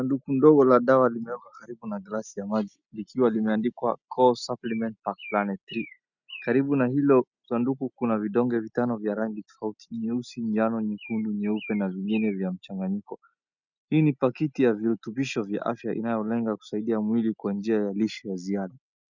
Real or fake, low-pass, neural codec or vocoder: real; 7.2 kHz; none